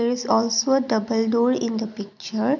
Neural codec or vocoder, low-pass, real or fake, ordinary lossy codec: none; 7.2 kHz; real; none